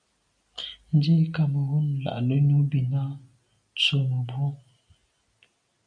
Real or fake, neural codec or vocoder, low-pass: real; none; 9.9 kHz